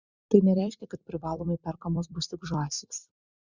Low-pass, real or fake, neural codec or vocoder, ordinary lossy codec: 7.2 kHz; fake; vocoder, 44.1 kHz, 128 mel bands every 256 samples, BigVGAN v2; Opus, 64 kbps